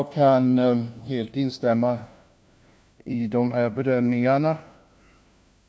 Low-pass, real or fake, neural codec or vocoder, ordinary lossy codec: none; fake; codec, 16 kHz, 1 kbps, FunCodec, trained on LibriTTS, 50 frames a second; none